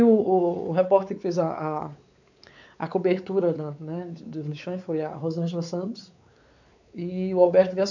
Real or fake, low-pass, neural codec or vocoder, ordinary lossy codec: fake; 7.2 kHz; codec, 16 kHz, 4 kbps, X-Codec, WavLM features, trained on Multilingual LibriSpeech; none